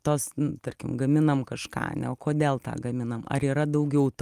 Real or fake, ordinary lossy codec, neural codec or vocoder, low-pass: real; Opus, 32 kbps; none; 14.4 kHz